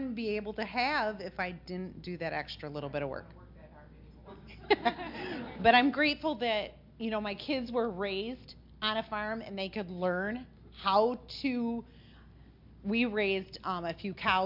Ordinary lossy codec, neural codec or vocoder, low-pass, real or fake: MP3, 48 kbps; none; 5.4 kHz; real